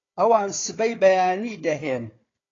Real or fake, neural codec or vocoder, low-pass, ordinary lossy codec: fake; codec, 16 kHz, 4 kbps, FunCodec, trained on Chinese and English, 50 frames a second; 7.2 kHz; AAC, 32 kbps